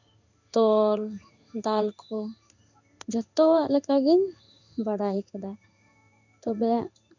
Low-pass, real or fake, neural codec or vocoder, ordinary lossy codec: 7.2 kHz; fake; codec, 16 kHz in and 24 kHz out, 1 kbps, XY-Tokenizer; none